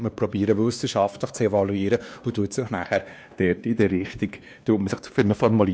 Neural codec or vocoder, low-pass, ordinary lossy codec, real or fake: codec, 16 kHz, 2 kbps, X-Codec, WavLM features, trained on Multilingual LibriSpeech; none; none; fake